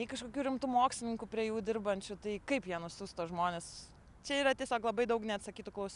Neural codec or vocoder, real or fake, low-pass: none; real; 10.8 kHz